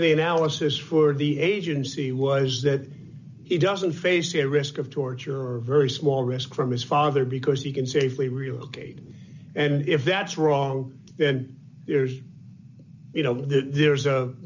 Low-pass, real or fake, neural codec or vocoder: 7.2 kHz; real; none